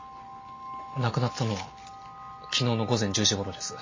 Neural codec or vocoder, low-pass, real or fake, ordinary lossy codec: none; 7.2 kHz; real; MP3, 32 kbps